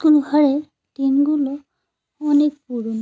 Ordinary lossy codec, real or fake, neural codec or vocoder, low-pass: none; real; none; none